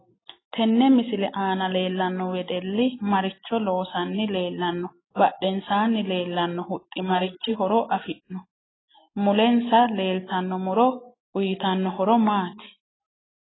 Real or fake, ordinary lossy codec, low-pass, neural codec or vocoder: real; AAC, 16 kbps; 7.2 kHz; none